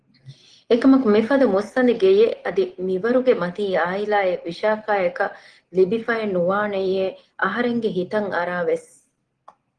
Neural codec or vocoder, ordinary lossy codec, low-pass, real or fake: none; Opus, 16 kbps; 9.9 kHz; real